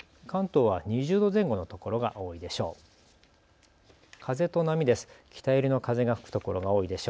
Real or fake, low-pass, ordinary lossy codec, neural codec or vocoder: real; none; none; none